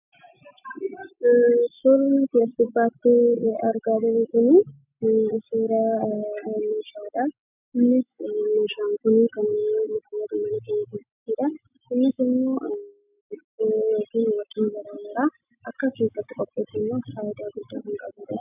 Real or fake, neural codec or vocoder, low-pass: real; none; 3.6 kHz